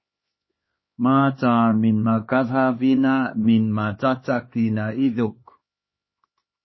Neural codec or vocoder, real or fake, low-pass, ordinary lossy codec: codec, 16 kHz, 2 kbps, X-Codec, HuBERT features, trained on LibriSpeech; fake; 7.2 kHz; MP3, 24 kbps